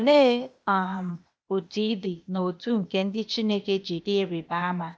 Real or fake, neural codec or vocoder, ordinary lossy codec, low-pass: fake; codec, 16 kHz, 0.8 kbps, ZipCodec; none; none